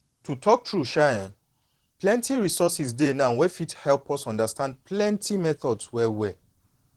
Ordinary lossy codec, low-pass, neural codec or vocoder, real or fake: Opus, 16 kbps; 19.8 kHz; vocoder, 44.1 kHz, 128 mel bands, Pupu-Vocoder; fake